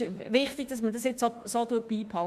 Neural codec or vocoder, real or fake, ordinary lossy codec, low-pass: autoencoder, 48 kHz, 32 numbers a frame, DAC-VAE, trained on Japanese speech; fake; Opus, 64 kbps; 14.4 kHz